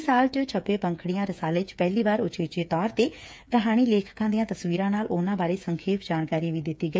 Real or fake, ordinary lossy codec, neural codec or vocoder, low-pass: fake; none; codec, 16 kHz, 8 kbps, FreqCodec, smaller model; none